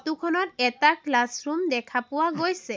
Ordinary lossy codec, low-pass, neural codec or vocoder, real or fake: none; none; none; real